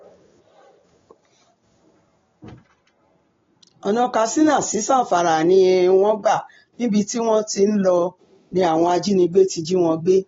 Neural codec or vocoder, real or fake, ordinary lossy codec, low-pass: none; real; AAC, 24 kbps; 7.2 kHz